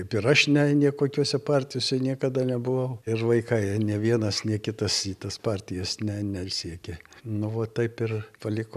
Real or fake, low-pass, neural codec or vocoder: real; 14.4 kHz; none